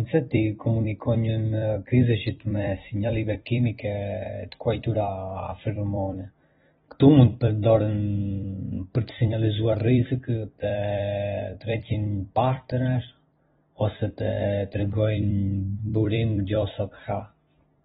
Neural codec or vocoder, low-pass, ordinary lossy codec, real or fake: vocoder, 44.1 kHz, 128 mel bands every 256 samples, BigVGAN v2; 19.8 kHz; AAC, 16 kbps; fake